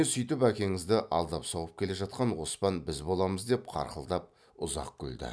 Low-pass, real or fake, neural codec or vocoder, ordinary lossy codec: none; real; none; none